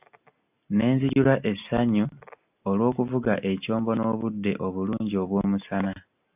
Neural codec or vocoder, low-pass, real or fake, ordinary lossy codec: none; 3.6 kHz; real; AAC, 32 kbps